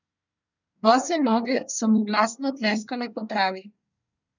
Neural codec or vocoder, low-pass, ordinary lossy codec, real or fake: codec, 24 kHz, 1 kbps, SNAC; 7.2 kHz; none; fake